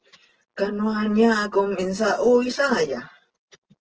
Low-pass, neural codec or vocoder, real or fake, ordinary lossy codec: 7.2 kHz; none; real; Opus, 16 kbps